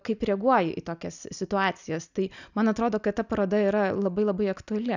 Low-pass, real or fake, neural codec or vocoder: 7.2 kHz; real; none